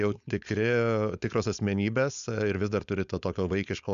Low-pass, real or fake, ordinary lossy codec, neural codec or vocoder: 7.2 kHz; fake; MP3, 96 kbps; codec, 16 kHz, 4.8 kbps, FACodec